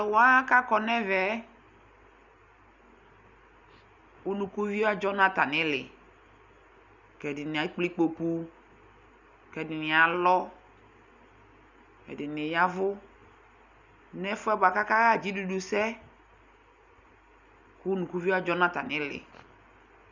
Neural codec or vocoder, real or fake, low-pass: none; real; 7.2 kHz